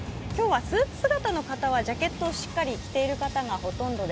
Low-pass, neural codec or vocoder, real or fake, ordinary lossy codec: none; none; real; none